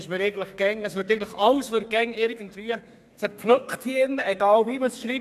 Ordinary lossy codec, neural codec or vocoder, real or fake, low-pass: none; codec, 32 kHz, 1.9 kbps, SNAC; fake; 14.4 kHz